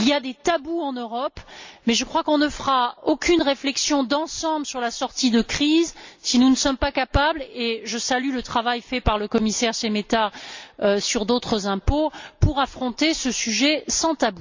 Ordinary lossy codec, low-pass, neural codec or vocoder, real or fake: MP3, 64 kbps; 7.2 kHz; none; real